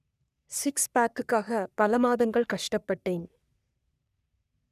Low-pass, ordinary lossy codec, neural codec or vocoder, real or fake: 14.4 kHz; none; codec, 44.1 kHz, 3.4 kbps, Pupu-Codec; fake